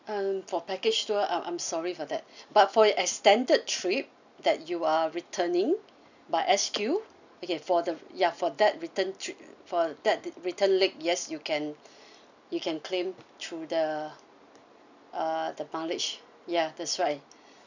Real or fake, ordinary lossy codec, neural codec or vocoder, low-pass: real; none; none; 7.2 kHz